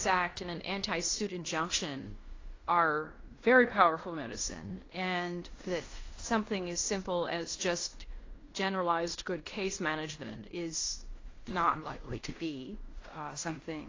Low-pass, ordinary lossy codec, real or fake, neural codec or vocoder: 7.2 kHz; AAC, 32 kbps; fake; codec, 16 kHz in and 24 kHz out, 0.9 kbps, LongCat-Audio-Codec, fine tuned four codebook decoder